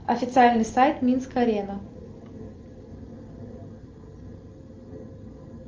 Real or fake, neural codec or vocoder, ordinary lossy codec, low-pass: real; none; Opus, 24 kbps; 7.2 kHz